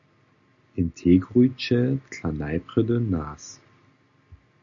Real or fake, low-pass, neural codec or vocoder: real; 7.2 kHz; none